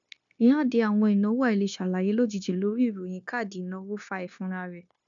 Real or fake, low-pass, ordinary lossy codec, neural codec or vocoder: fake; 7.2 kHz; none; codec, 16 kHz, 0.9 kbps, LongCat-Audio-Codec